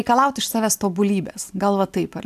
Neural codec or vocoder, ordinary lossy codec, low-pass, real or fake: none; AAC, 96 kbps; 14.4 kHz; real